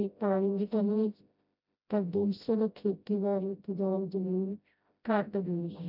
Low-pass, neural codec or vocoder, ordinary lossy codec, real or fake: 5.4 kHz; codec, 16 kHz, 0.5 kbps, FreqCodec, smaller model; none; fake